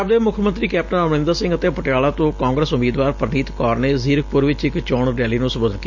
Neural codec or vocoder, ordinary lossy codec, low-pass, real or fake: none; MP3, 64 kbps; 7.2 kHz; real